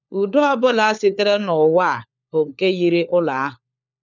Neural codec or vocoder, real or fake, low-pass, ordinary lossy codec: codec, 16 kHz, 4 kbps, FunCodec, trained on LibriTTS, 50 frames a second; fake; 7.2 kHz; none